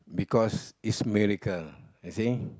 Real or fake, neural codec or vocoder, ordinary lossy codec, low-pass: real; none; none; none